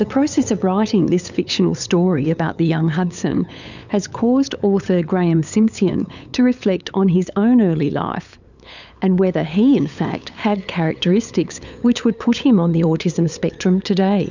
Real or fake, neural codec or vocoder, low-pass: fake; codec, 16 kHz, 8 kbps, FunCodec, trained on LibriTTS, 25 frames a second; 7.2 kHz